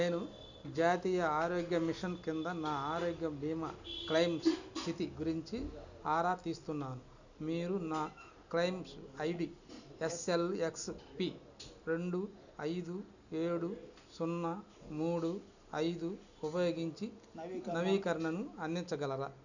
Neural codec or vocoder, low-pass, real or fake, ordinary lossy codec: none; 7.2 kHz; real; none